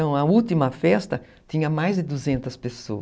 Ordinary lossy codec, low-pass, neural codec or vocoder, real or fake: none; none; none; real